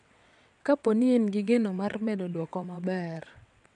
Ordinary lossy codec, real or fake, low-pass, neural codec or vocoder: none; fake; 9.9 kHz; vocoder, 22.05 kHz, 80 mel bands, WaveNeXt